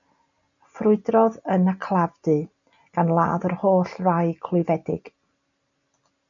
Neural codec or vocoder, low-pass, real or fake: none; 7.2 kHz; real